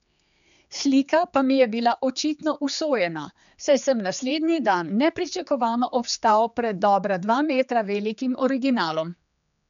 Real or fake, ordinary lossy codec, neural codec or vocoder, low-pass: fake; none; codec, 16 kHz, 4 kbps, X-Codec, HuBERT features, trained on general audio; 7.2 kHz